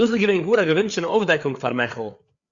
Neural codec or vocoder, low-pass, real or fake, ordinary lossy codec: codec, 16 kHz, 4 kbps, FreqCodec, larger model; 7.2 kHz; fake; Opus, 64 kbps